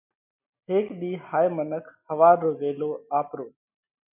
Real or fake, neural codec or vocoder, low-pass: real; none; 3.6 kHz